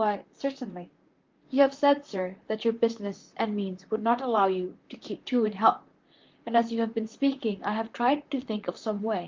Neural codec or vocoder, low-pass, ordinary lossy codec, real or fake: vocoder, 44.1 kHz, 128 mel bands, Pupu-Vocoder; 7.2 kHz; Opus, 32 kbps; fake